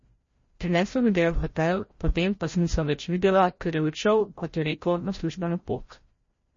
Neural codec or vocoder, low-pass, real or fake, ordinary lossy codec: codec, 16 kHz, 0.5 kbps, FreqCodec, larger model; 7.2 kHz; fake; MP3, 32 kbps